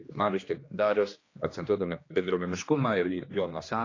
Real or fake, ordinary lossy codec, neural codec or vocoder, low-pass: fake; AAC, 32 kbps; codec, 16 kHz, 2 kbps, X-Codec, HuBERT features, trained on general audio; 7.2 kHz